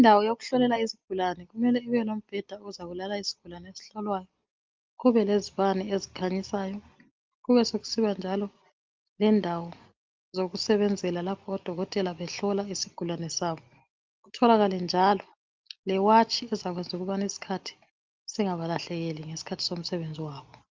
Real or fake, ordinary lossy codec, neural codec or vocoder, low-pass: real; Opus, 32 kbps; none; 7.2 kHz